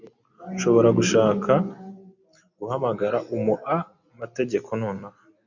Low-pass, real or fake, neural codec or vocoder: 7.2 kHz; real; none